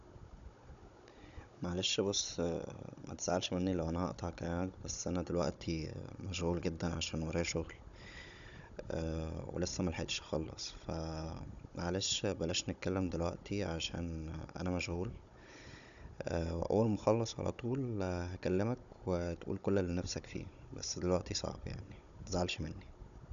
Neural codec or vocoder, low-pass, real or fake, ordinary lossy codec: codec, 16 kHz, 16 kbps, FunCodec, trained on Chinese and English, 50 frames a second; 7.2 kHz; fake; none